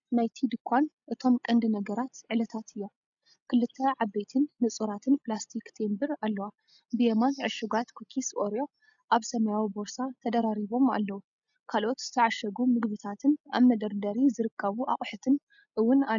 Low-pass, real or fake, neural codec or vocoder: 7.2 kHz; real; none